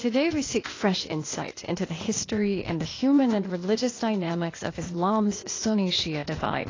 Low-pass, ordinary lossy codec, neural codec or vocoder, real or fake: 7.2 kHz; AAC, 32 kbps; codec, 16 kHz, 0.8 kbps, ZipCodec; fake